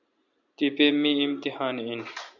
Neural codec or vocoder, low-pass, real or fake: none; 7.2 kHz; real